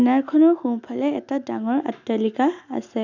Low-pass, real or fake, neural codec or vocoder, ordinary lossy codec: 7.2 kHz; real; none; none